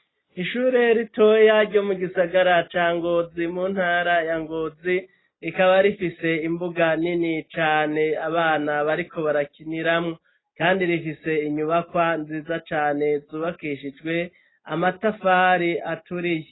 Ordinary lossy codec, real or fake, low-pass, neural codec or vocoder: AAC, 16 kbps; real; 7.2 kHz; none